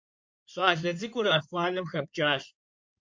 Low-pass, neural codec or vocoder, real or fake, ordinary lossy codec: 7.2 kHz; codec, 16 kHz in and 24 kHz out, 2.2 kbps, FireRedTTS-2 codec; fake; MP3, 48 kbps